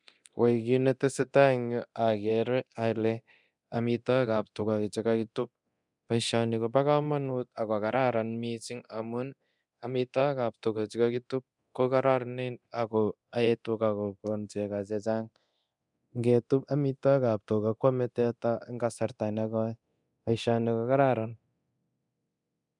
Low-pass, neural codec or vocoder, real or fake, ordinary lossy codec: 10.8 kHz; codec, 24 kHz, 0.9 kbps, DualCodec; fake; none